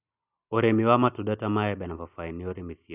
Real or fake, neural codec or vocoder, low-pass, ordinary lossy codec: real; none; 3.6 kHz; none